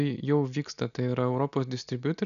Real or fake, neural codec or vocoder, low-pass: real; none; 7.2 kHz